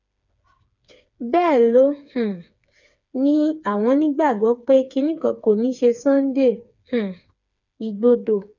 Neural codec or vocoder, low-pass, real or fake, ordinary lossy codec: codec, 16 kHz, 4 kbps, FreqCodec, smaller model; 7.2 kHz; fake; none